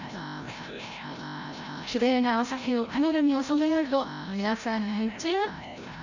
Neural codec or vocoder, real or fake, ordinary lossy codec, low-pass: codec, 16 kHz, 0.5 kbps, FreqCodec, larger model; fake; none; 7.2 kHz